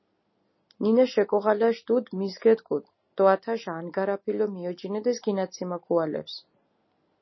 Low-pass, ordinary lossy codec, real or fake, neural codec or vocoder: 7.2 kHz; MP3, 24 kbps; real; none